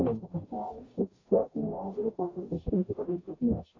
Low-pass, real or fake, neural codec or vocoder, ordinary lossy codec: 7.2 kHz; fake; codec, 44.1 kHz, 0.9 kbps, DAC; AAC, 32 kbps